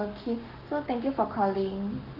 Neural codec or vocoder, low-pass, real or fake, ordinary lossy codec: codec, 44.1 kHz, 7.8 kbps, Pupu-Codec; 5.4 kHz; fake; Opus, 32 kbps